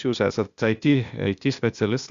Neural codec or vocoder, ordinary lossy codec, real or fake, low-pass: codec, 16 kHz, 0.8 kbps, ZipCodec; Opus, 64 kbps; fake; 7.2 kHz